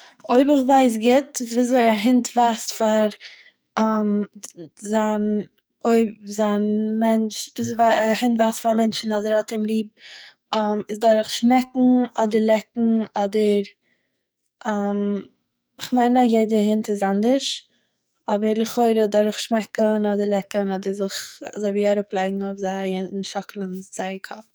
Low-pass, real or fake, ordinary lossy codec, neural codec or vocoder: none; fake; none; codec, 44.1 kHz, 2.6 kbps, SNAC